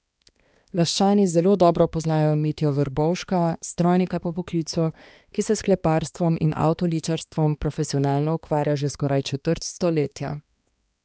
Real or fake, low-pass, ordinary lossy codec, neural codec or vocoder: fake; none; none; codec, 16 kHz, 2 kbps, X-Codec, HuBERT features, trained on balanced general audio